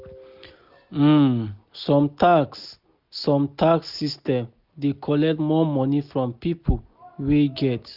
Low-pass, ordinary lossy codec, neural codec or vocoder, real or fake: 5.4 kHz; Opus, 64 kbps; none; real